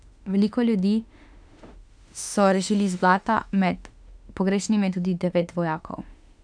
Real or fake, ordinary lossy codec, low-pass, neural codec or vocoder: fake; none; 9.9 kHz; autoencoder, 48 kHz, 32 numbers a frame, DAC-VAE, trained on Japanese speech